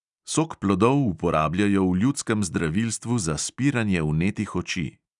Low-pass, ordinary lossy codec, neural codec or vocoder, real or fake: 10.8 kHz; none; none; real